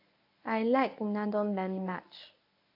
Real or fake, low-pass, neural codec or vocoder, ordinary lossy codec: fake; 5.4 kHz; codec, 24 kHz, 0.9 kbps, WavTokenizer, medium speech release version 1; AAC, 32 kbps